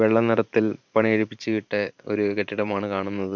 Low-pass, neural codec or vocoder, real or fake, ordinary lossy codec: 7.2 kHz; vocoder, 44.1 kHz, 128 mel bands every 512 samples, BigVGAN v2; fake; none